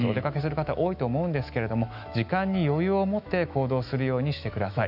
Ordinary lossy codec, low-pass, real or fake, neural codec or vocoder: none; 5.4 kHz; fake; autoencoder, 48 kHz, 128 numbers a frame, DAC-VAE, trained on Japanese speech